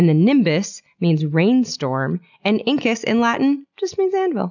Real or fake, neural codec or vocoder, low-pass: real; none; 7.2 kHz